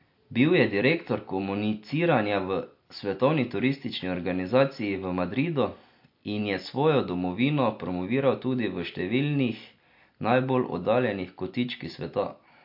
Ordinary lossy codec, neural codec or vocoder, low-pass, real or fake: MP3, 32 kbps; none; 5.4 kHz; real